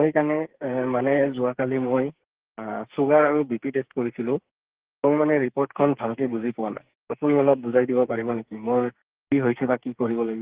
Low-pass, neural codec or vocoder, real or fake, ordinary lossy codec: 3.6 kHz; codec, 44.1 kHz, 2.6 kbps, SNAC; fake; Opus, 16 kbps